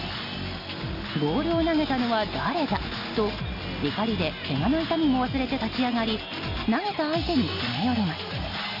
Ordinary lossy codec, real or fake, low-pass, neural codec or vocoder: none; real; 5.4 kHz; none